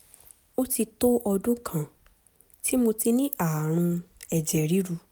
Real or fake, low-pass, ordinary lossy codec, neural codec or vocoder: real; none; none; none